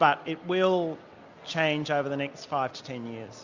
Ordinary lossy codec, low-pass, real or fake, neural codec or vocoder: Opus, 64 kbps; 7.2 kHz; real; none